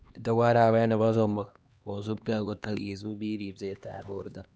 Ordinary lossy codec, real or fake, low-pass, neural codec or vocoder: none; fake; none; codec, 16 kHz, 2 kbps, X-Codec, HuBERT features, trained on LibriSpeech